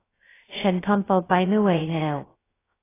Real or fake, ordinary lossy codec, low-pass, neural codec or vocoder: fake; AAC, 16 kbps; 3.6 kHz; codec, 16 kHz, 0.2 kbps, FocalCodec